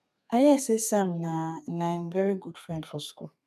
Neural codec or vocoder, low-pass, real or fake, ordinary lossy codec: codec, 32 kHz, 1.9 kbps, SNAC; 14.4 kHz; fake; none